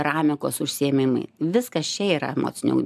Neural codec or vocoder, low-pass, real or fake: none; 14.4 kHz; real